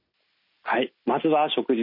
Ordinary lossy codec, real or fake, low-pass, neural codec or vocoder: none; real; 5.4 kHz; none